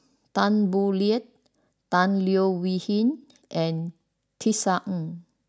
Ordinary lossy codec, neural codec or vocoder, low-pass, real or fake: none; none; none; real